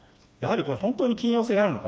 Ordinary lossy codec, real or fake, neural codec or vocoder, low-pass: none; fake; codec, 16 kHz, 2 kbps, FreqCodec, smaller model; none